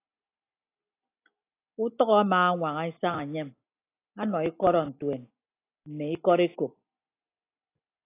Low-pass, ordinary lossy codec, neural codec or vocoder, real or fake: 3.6 kHz; AAC, 24 kbps; none; real